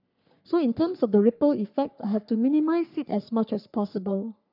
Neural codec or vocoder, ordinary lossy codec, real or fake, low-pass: codec, 44.1 kHz, 3.4 kbps, Pupu-Codec; none; fake; 5.4 kHz